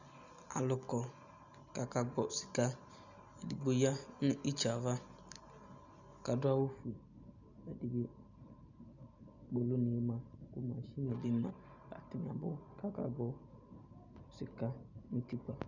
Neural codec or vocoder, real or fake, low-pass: none; real; 7.2 kHz